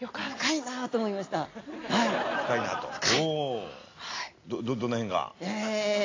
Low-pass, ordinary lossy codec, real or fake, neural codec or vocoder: 7.2 kHz; AAC, 32 kbps; real; none